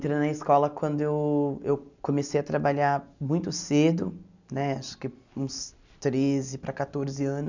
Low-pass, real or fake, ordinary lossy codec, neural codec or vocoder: 7.2 kHz; real; none; none